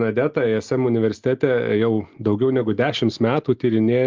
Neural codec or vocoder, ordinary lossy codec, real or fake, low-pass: none; Opus, 24 kbps; real; 7.2 kHz